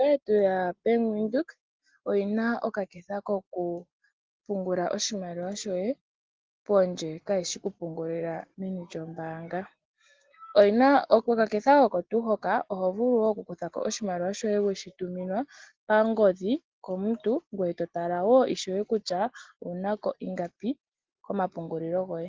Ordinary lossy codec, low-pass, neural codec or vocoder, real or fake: Opus, 16 kbps; 7.2 kHz; none; real